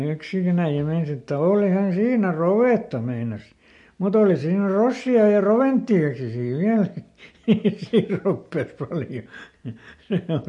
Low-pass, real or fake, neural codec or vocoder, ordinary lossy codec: 10.8 kHz; real; none; MP3, 48 kbps